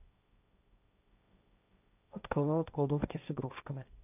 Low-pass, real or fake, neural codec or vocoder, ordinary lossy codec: 3.6 kHz; fake; codec, 16 kHz, 1.1 kbps, Voila-Tokenizer; none